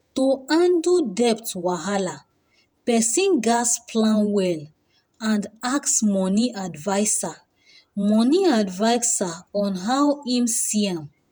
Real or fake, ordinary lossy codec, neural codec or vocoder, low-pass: fake; none; vocoder, 48 kHz, 128 mel bands, Vocos; none